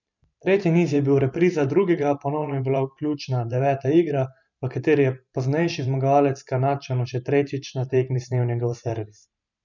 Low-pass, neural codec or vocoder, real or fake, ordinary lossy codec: 7.2 kHz; vocoder, 44.1 kHz, 128 mel bands every 512 samples, BigVGAN v2; fake; none